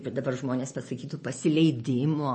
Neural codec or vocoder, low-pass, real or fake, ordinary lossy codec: none; 10.8 kHz; real; MP3, 32 kbps